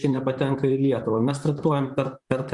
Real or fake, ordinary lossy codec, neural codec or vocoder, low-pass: fake; Opus, 64 kbps; vocoder, 44.1 kHz, 128 mel bands, Pupu-Vocoder; 10.8 kHz